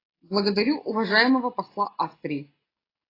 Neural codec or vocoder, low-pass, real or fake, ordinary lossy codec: none; 5.4 kHz; real; AAC, 24 kbps